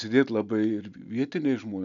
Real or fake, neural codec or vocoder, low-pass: real; none; 7.2 kHz